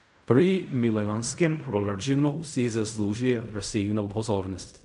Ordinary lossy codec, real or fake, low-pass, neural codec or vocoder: none; fake; 10.8 kHz; codec, 16 kHz in and 24 kHz out, 0.4 kbps, LongCat-Audio-Codec, fine tuned four codebook decoder